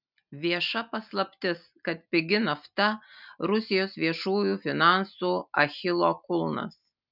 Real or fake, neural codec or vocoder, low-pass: real; none; 5.4 kHz